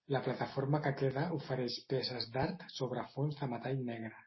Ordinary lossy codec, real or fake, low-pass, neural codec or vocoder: MP3, 24 kbps; real; 7.2 kHz; none